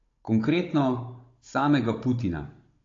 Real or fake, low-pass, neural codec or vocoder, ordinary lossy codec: fake; 7.2 kHz; codec, 16 kHz, 16 kbps, FunCodec, trained on Chinese and English, 50 frames a second; AAC, 32 kbps